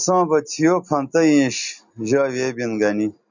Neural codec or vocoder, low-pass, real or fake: none; 7.2 kHz; real